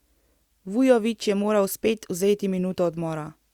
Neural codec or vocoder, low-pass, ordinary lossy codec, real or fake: none; 19.8 kHz; Opus, 64 kbps; real